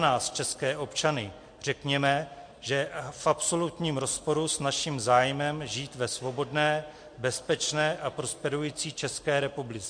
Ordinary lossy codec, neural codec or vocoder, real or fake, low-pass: MP3, 48 kbps; none; real; 9.9 kHz